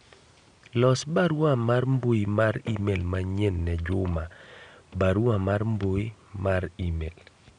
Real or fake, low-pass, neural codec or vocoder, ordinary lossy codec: real; 9.9 kHz; none; none